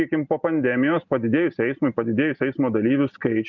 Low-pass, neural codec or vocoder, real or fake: 7.2 kHz; none; real